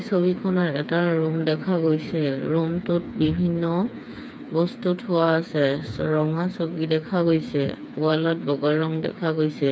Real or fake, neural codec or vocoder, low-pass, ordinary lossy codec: fake; codec, 16 kHz, 4 kbps, FreqCodec, smaller model; none; none